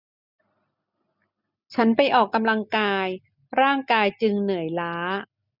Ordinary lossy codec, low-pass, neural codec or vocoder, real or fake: none; 5.4 kHz; none; real